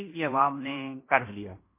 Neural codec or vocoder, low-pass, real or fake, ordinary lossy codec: codec, 16 kHz in and 24 kHz out, 0.9 kbps, LongCat-Audio-Codec, fine tuned four codebook decoder; 3.6 kHz; fake; MP3, 24 kbps